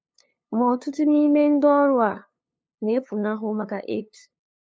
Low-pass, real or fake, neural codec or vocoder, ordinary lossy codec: none; fake; codec, 16 kHz, 2 kbps, FunCodec, trained on LibriTTS, 25 frames a second; none